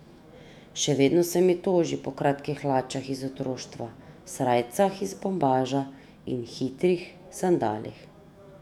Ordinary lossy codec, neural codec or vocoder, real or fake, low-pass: none; autoencoder, 48 kHz, 128 numbers a frame, DAC-VAE, trained on Japanese speech; fake; 19.8 kHz